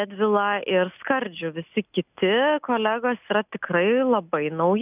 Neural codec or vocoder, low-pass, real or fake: none; 3.6 kHz; real